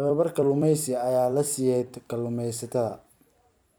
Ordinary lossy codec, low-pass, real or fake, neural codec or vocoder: none; none; fake; vocoder, 44.1 kHz, 128 mel bands every 256 samples, BigVGAN v2